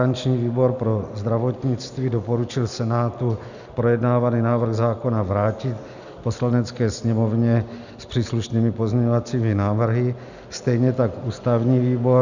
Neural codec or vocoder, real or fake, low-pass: none; real; 7.2 kHz